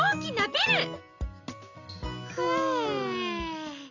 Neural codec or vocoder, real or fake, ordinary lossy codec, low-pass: none; real; none; 7.2 kHz